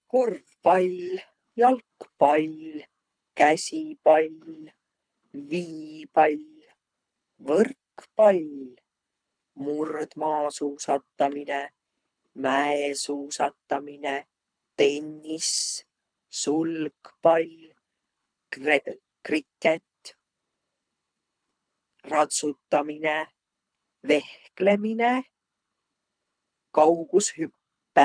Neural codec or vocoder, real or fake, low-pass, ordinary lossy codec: codec, 24 kHz, 3 kbps, HILCodec; fake; 9.9 kHz; none